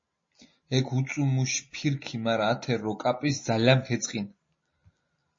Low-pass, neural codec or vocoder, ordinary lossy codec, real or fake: 7.2 kHz; none; MP3, 32 kbps; real